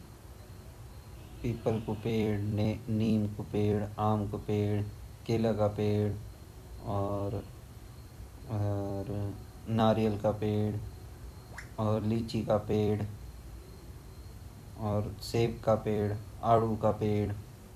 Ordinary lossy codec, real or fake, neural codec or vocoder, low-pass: none; fake; vocoder, 44.1 kHz, 128 mel bands every 256 samples, BigVGAN v2; 14.4 kHz